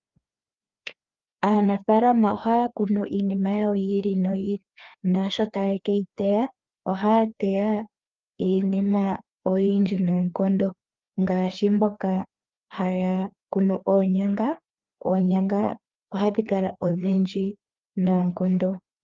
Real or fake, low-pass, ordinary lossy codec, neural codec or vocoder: fake; 7.2 kHz; Opus, 32 kbps; codec, 16 kHz, 2 kbps, FreqCodec, larger model